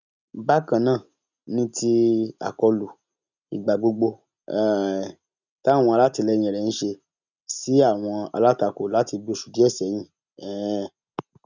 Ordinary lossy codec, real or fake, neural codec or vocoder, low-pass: none; real; none; 7.2 kHz